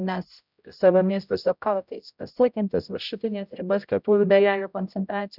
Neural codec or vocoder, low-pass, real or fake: codec, 16 kHz, 0.5 kbps, X-Codec, HuBERT features, trained on general audio; 5.4 kHz; fake